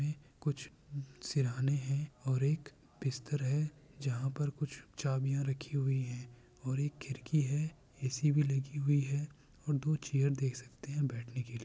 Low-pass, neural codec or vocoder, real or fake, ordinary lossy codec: none; none; real; none